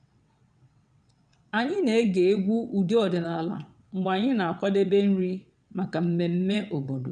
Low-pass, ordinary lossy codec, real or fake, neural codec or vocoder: 9.9 kHz; none; fake; vocoder, 22.05 kHz, 80 mel bands, WaveNeXt